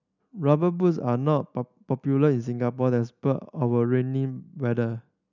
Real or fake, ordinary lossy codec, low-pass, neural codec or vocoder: real; none; 7.2 kHz; none